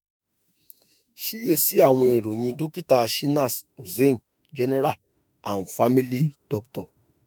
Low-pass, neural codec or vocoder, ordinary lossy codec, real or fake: none; autoencoder, 48 kHz, 32 numbers a frame, DAC-VAE, trained on Japanese speech; none; fake